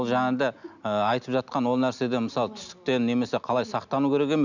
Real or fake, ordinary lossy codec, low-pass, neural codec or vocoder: real; none; 7.2 kHz; none